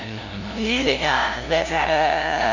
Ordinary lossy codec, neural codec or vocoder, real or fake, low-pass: none; codec, 16 kHz, 0.5 kbps, FunCodec, trained on LibriTTS, 25 frames a second; fake; 7.2 kHz